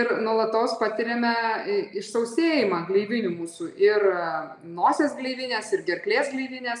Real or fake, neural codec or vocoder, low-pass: real; none; 10.8 kHz